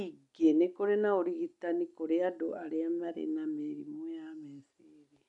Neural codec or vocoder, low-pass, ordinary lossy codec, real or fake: none; 10.8 kHz; none; real